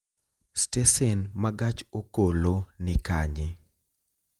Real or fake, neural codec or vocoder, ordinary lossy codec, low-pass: fake; vocoder, 48 kHz, 128 mel bands, Vocos; Opus, 32 kbps; 19.8 kHz